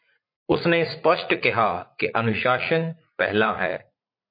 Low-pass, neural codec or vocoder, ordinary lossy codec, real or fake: 5.4 kHz; vocoder, 44.1 kHz, 80 mel bands, Vocos; MP3, 32 kbps; fake